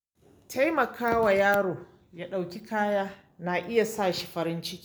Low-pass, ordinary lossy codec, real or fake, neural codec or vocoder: none; none; real; none